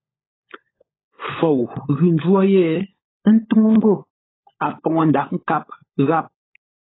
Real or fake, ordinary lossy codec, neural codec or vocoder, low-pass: fake; AAC, 16 kbps; codec, 16 kHz, 16 kbps, FunCodec, trained on LibriTTS, 50 frames a second; 7.2 kHz